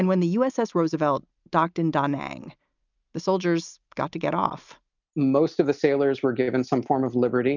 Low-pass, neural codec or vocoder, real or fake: 7.2 kHz; none; real